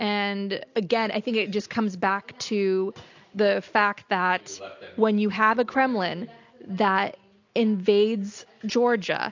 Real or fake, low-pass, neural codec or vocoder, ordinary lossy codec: real; 7.2 kHz; none; AAC, 48 kbps